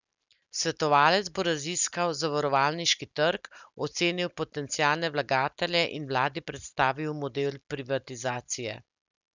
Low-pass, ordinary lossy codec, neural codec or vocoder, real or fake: 7.2 kHz; none; none; real